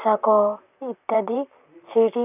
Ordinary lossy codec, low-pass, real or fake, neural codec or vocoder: none; 3.6 kHz; fake; vocoder, 44.1 kHz, 128 mel bands, Pupu-Vocoder